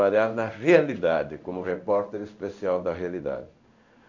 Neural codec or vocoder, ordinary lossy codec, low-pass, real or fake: codec, 16 kHz in and 24 kHz out, 1 kbps, XY-Tokenizer; none; 7.2 kHz; fake